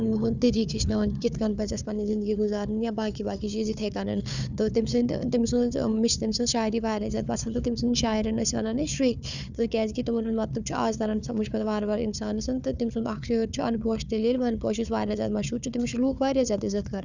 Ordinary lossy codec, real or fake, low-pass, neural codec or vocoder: none; fake; 7.2 kHz; codec, 16 kHz, 4 kbps, FunCodec, trained on Chinese and English, 50 frames a second